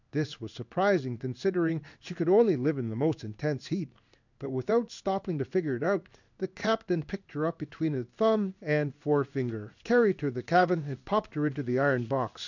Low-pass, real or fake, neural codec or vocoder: 7.2 kHz; fake; codec, 16 kHz in and 24 kHz out, 1 kbps, XY-Tokenizer